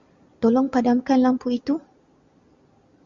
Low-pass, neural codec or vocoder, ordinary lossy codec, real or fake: 7.2 kHz; none; Opus, 64 kbps; real